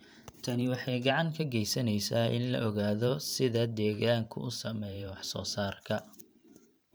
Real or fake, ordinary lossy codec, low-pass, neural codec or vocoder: fake; none; none; vocoder, 44.1 kHz, 128 mel bands every 512 samples, BigVGAN v2